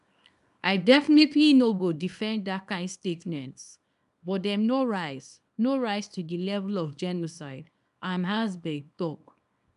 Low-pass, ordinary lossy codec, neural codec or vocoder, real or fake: 10.8 kHz; none; codec, 24 kHz, 0.9 kbps, WavTokenizer, small release; fake